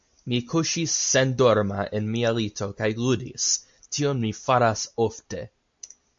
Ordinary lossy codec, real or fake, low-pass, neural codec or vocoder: MP3, 48 kbps; real; 7.2 kHz; none